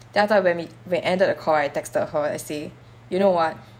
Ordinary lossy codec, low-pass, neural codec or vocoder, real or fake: none; 19.8 kHz; vocoder, 48 kHz, 128 mel bands, Vocos; fake